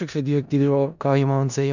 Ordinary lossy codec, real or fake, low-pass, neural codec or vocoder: none; fake; 7.2 kHz; codec, 16 kHz in and 24 kHz out, 0.4 kbps, LongCat-Audio-Codec, four codebook decoder